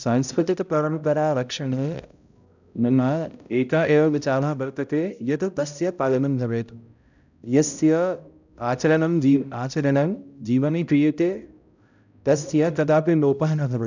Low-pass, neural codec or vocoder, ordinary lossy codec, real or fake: 7.2 kHz; codec, 16 kHz, 0.5 kbps, X-Codec, HuBERT features, trained on balanced general audio; none; fake